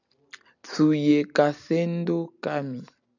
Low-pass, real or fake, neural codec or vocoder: 7.2 kHz; real; none